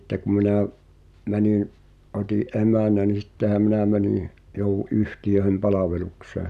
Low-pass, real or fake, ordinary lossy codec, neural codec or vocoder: 14.4 kHz; real; none; none